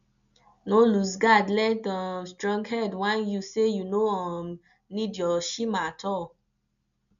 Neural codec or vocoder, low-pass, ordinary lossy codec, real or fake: none; 7.2 kHz; none; real